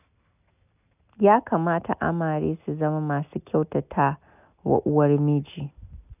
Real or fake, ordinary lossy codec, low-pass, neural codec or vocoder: real; none; 3.6 kHz; none